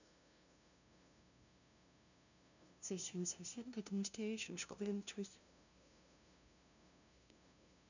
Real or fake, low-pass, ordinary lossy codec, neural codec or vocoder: fake; 7.2 kHz; none; codec, 16 kHz, 0.5 kbps, FunCodec, trained on LibriTTS, 25 frames a second